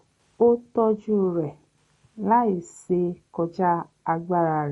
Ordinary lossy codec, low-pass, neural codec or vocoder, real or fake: MP3, 48 kbps; 19.8 kHz; none; real